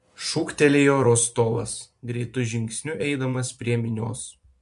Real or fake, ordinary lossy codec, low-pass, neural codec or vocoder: fake; MP3, 64 kbps; 10.8 kHz; vocoder, 24 kHz, 100 mel bands, Vocos